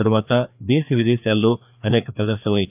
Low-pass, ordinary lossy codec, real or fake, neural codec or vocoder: 3.6 kHz; AAC, 32 kbps; fake; codec, 16 kHz, 2 kbps, FreqCodec, larger model